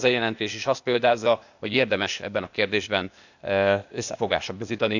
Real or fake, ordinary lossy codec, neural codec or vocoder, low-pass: fake; none; codec, 16 kHz, 0.8 kbps, ZipCodec; 7.2 kHz